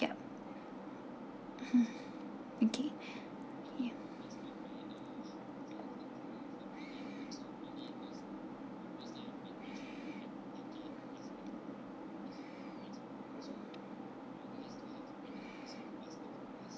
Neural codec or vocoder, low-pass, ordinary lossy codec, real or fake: none; none; none; real